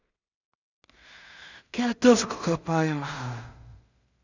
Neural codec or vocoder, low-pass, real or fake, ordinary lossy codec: codec, 16 kHz in and 24 kHz out, 0.4 kbps, LongCat-Audio-Codec, two codebook decoder; 7.2 kHz; fake; none